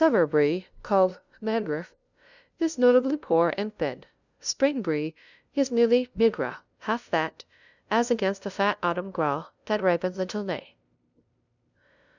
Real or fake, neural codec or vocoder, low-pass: fake; codec, 16 kHz, 0.5 kbps, FunCodec, trained on LibriTTS, 25 frames a second; 7.2 kHz